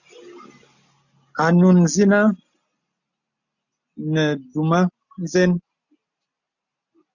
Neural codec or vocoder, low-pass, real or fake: none; 7.2 kHz; real